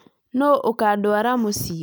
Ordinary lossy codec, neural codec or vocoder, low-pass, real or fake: none; none; none; real